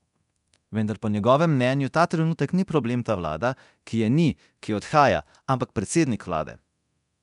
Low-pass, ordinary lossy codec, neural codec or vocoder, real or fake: 10.8 kHz; none; codec, 24 kHz, 0.9 kbps, DualCodec; fake